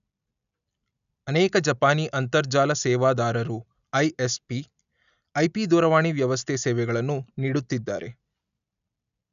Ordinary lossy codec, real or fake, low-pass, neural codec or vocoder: none; real; 7.2 kHz; none